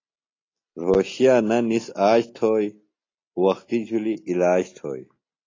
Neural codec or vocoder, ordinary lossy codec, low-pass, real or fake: none; AAC, 32 kbps; 7.2 kHz; real